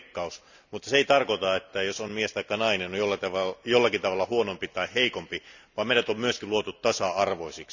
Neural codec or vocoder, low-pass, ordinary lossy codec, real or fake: none; 7.2 kHz; none; real